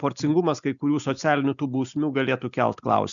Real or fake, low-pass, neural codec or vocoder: real; 7.2 kHz; none